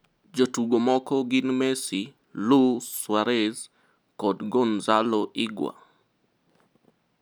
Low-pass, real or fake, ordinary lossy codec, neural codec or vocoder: none; real; none; none